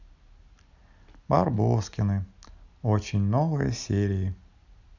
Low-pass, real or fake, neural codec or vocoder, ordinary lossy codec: 7.2 kHz; real; none; none